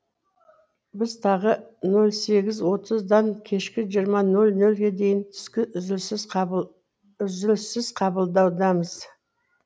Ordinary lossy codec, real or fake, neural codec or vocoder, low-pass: none; real; none; none